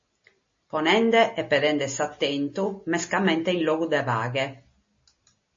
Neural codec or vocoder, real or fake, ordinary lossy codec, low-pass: none; real; MP3, 32 kbps; 7.2 kHz